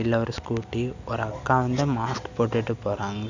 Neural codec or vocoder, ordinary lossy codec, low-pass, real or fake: none; none; 7.2 kHz; real